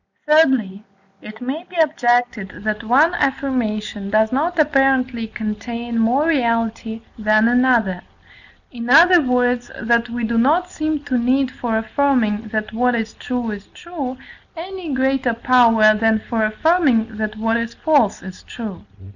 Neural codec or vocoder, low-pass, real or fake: none; 7.2 kHz; real